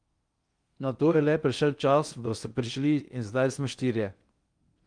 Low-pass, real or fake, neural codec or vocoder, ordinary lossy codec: 9.9 kHz; fake; codec, 16 kHz in and 24 kHz out, 0.6 kbps, FocalCodec, streaming, 2048 codes; Opus, 32 kbps